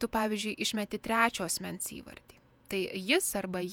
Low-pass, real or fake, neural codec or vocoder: 19.8 kHz; fake; vocoder, 48 kHz, 128 mel bands, Vocos